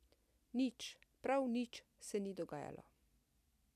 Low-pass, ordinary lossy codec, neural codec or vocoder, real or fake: 14.4 kHz; none; none; real